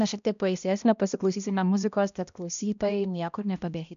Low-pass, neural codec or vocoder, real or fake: 7.2 kHz; codec, 16 kHz, 1 kbps, X-Codec, HuBERT features, trained on balanced general audio; fake